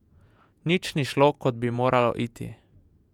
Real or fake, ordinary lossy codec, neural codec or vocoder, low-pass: fake; none; vocoder, 48 kHz, 128 mel bands, Vocos; 19.8 kHz